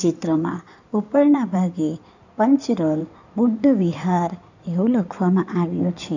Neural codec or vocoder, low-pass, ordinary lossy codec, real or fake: codec, 16 kHz in and 24 kHz out, 2.2 kbps, FireRedTTS-2 codec; 7.2 kHz; none; fake